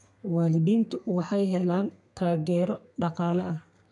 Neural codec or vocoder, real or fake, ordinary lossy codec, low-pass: codec, 32 kHz, 1.9 kbps, SNAC; fake; MP3, 96 kbps; 10.8 kHz